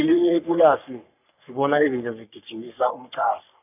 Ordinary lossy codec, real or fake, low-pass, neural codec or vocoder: none; fake; 3.6 kHz; codec, 44.1 kHz, 3.4 kbps, Pupu-Codec